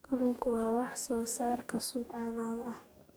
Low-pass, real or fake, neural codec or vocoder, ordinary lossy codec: none; fake; codec, 44.1 kHz, 2.6 kbps, DAC; none